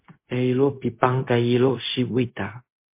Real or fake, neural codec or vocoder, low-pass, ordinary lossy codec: fake; codec, 16 kHz, 0.4 kbps, LongCat-Audio-Codec; 3.6 kHz; MP3, 24 kbps